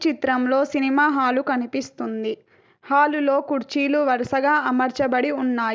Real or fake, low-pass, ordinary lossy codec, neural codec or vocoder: real; none; none; none